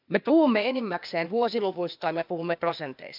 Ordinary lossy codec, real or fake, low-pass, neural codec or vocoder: MP3, 48 kbps; fake; 5.4 kHz; codec, 16 kHz, 0.8 kbps, ZipCodec